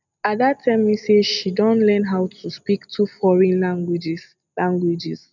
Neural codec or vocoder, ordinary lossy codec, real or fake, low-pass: none; none; real; 7.2 kHz